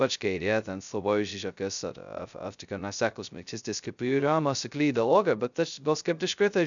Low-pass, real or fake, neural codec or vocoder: 7.2 kHz; fake; codec, 16 kHz, 0.2 kbps, FocalCodec